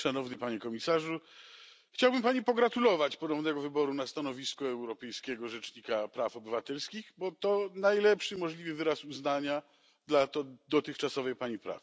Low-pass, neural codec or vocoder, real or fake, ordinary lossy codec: none; none; real; none